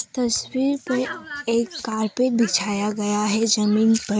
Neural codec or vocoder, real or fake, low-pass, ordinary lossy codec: none; real; none; none